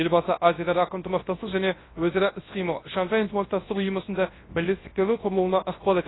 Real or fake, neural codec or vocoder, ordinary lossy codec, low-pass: fake; codec, 24 kHz, 0.9 kbps, WavTokenizer, large speech release; AAC, 16 kbps; 7.2 kHz